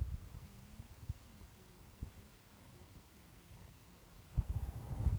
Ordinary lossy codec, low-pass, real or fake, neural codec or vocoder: none; none; fake; codec, 44.1 kHz, 2.6 kbps, SNAC